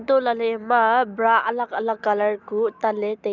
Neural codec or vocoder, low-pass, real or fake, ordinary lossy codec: none; 7.2 kHz; real; none